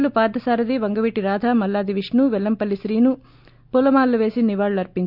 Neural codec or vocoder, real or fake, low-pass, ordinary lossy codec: none; real; 5.4 kHz; none